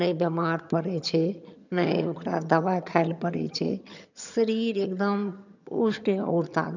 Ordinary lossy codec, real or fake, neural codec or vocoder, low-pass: none; fake; vocoder, 22.05 kHz, 80 mel bands, HiFi-GAN; 7.2 kHz